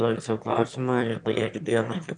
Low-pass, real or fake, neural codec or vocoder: 9.9 kHz; fake; autoencoder, 22.05 kHz, a latent of 192 numbers a frame, VITS, trained on one speaker